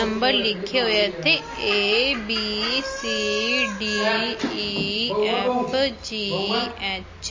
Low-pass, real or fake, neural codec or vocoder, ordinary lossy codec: 7.2 kHz; real; none; MP3, 32 kbps